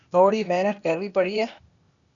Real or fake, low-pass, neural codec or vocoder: fake; 7.2 kHz; codec, 16 kHz, 0.8 kbps, ZipCodec